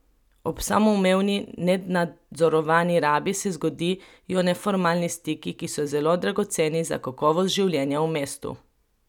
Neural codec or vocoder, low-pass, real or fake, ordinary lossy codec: none; 19.8 kHz; real; none